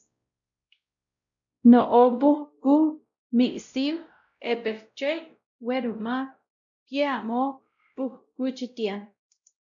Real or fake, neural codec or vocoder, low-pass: fake; codec, 16 kHz, 0.5 kbps, X-Codec, WavLM features, trained on Multilingual LibriSpeech; 7.2 kHz